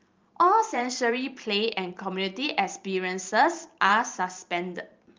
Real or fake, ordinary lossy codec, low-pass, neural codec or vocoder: fake; Opus, 24 kbps; 7.2 kHz; vocoder, 44.1 kHz, 128 mel bands every 512 samples, BigVGAN v2